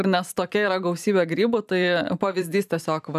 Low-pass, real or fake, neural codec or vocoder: 14.4 kHz; fake; vocoder, 44.1 kHz, 128 mel bands every 512 samples, BigVGAN v2